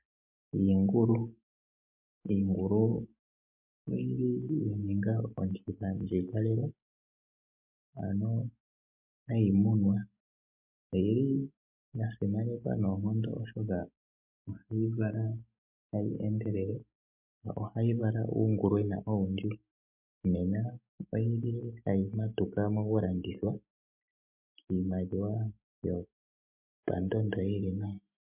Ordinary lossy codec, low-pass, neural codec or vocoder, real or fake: MP3, 32 kbps; 3.6 kHz; none; real